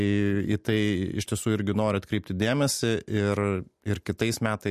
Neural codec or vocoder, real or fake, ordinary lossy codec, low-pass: none; real; MP3, 64 kbps; 14.4 kHz